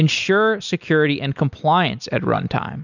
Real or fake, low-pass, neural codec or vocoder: real; 7.2 kHz; none